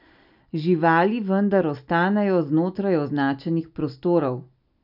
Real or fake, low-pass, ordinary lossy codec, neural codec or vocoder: real; 5.4 kHz; none; none